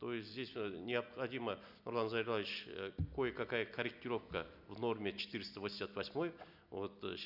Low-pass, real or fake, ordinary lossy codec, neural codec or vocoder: 5.4 kHz; real; none; none